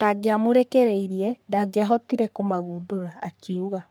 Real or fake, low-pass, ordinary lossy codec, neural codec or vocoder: fake; none; none; codec, 44.1 kHz, 3.4 kbps, Pupu-Codec